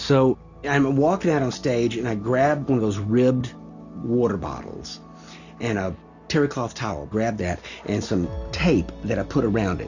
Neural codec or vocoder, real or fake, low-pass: none; real; 7.2 kHz